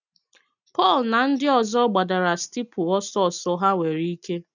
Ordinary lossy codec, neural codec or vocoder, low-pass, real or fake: none; none; 7.2 kHz; real